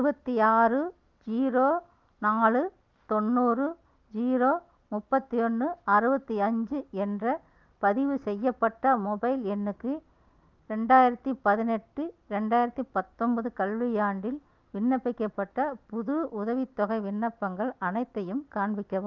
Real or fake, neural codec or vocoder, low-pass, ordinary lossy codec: real; none; 7.2 kHz; Opus, 32 kbps